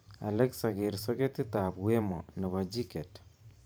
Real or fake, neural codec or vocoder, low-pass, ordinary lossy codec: fake; vocoder, 44.1 kHz, 128 mel bands every 512 samples, BigVGAN v2; none; none